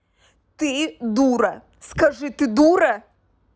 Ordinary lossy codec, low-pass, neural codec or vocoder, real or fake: none; none; none; real